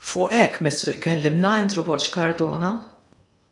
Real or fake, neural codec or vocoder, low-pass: fake; codec, 16 kHz in and 24 kHz out, 0.8 kbps, FocalCodec, streaming, 65536 codes; 10.8 kHz